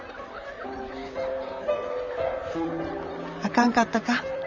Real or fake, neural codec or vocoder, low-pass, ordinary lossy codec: fake; vocoder, 44.1 kHz, 128 mel bands, Pupu-Vocoder; 7.2 kHz; none